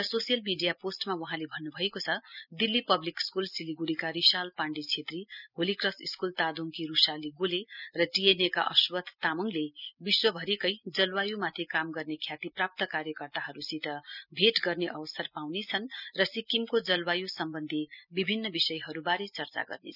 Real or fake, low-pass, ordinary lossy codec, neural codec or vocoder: real; 5.4 kHz; none; none